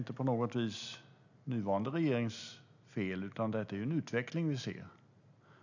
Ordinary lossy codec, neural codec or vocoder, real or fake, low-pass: none; none; real; 7.2 kHz